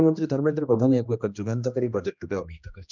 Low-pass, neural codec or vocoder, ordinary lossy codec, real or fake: 7.2 kHz; codec, 16 kHz, 1 kbps, X-Codec, HuBERT features, trained on general audio; none; fake